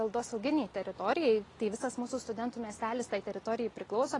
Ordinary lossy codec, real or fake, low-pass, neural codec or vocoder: AAC, 32 kbps; real; 10.8 kHz; none